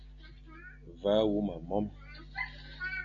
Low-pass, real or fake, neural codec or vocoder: 7.2 kHz; real; none